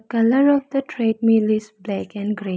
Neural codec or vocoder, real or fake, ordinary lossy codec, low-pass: none; real; none; none